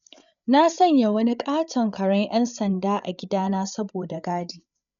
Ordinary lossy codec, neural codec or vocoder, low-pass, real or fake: none; codec, 16 kHz, 8 kbps, FreqCodec, larger model; 7.2 kHz; fake